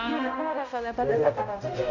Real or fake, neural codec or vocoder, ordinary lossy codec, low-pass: fake; codec, 16 kHz, 0.5 kbps, X-Codec, HuBERT features, trained on balanced general audio; none; 7.2 kHz